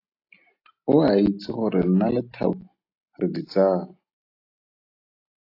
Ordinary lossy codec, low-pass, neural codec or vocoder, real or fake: AAC, 32 kbps; 5.4 kHz; none; real